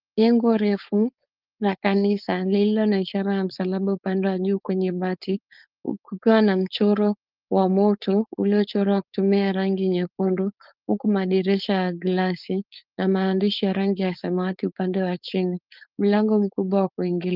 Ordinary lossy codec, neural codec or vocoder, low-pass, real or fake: Opus, 32 kbps; codec, 16 kHz, 4.8 kbps, FACodec; 5.4 kHz; fake